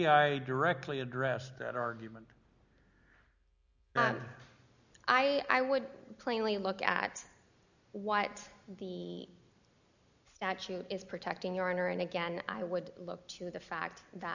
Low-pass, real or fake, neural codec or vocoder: 7.2 kHz; real; none